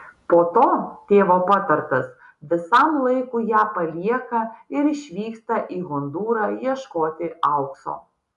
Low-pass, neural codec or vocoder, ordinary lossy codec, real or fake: 10.8 kHz; none; AAC, 96 kbps; real